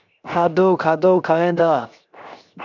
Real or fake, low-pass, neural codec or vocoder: fake; 7.2 kHz; codec, 16 kHz, 0.7 kbps, FocalCodec